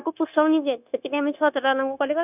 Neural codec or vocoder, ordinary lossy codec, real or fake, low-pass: codec, 16 kHz, 0.9 kbps, LongCat-Audio-Codec; none; fake; 3.6 kHz